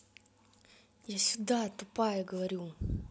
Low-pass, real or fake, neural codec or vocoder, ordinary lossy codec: none; real; none; none